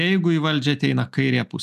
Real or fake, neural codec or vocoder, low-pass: real; none; 14.4 kHz